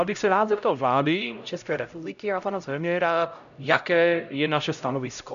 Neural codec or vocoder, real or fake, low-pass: codec, 16 kHz, 0.5 kbps, X-Codec, HuBERT features, trained on LibriSpeech; fake; 7.2 kHz